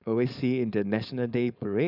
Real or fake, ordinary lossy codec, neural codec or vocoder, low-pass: fake; none; codec, 16 kHz, 4.8 kbps, FACodec; 5.4 kHz